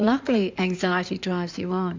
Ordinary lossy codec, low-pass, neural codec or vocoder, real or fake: MP3, 64 kbps; 7.2 kHz; codec, 16 kHz in and 24 kHz out, 2.2 kbps, FireRedTTS-2 codec; fake